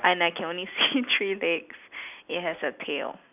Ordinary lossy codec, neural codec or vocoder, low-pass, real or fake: none; none; 3.6 kHz; real